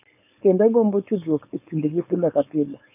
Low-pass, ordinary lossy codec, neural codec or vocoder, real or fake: 3.6 kHz; none; codec, 16 kHz, 4.8 kbps, FACodec; fake